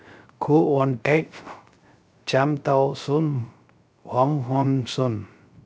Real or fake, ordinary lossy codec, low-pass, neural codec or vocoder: fake; none; none; codec, 16 kHz, 0.3 kbps, FocalCodec